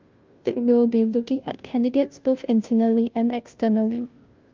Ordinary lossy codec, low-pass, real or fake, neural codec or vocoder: Opus, 24 kbps; 7.2 kHz; fake; codec, 16 kHz, 0.5 kbps, FunCodec, trained on Chinese and English, 25 frames a second